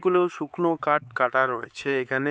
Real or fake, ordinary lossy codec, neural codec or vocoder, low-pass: fake; none; codec, 16 kHz, 4 kbps, X-Codec, HuBERT features, trained on LibriSpeech; none